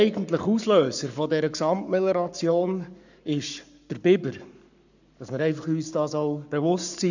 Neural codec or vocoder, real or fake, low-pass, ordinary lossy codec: codec, 44.1 kHz, 7.8 kbps, Pupu-Codec; fake; 7.2 kHz; none